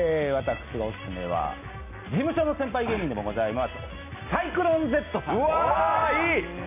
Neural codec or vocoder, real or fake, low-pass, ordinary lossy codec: none; real; 3.6 kHz; none